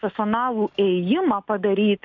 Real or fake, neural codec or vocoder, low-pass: real; none; 7.2 kHz